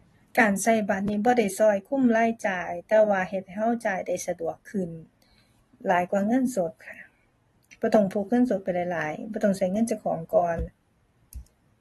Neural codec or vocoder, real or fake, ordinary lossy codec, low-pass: vocoder, 44.1 kHz, 128 mel bands every 256 samples, BigVGAN v2; fake; AAC, 32 kbps; 19.8 kHz